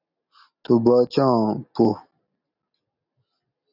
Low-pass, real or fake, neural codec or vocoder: 5.4 kHz; real; none